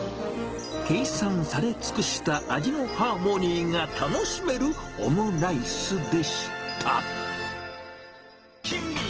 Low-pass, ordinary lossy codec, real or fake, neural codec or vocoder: 7.2 kHz; Opus, 16 kbps; real; none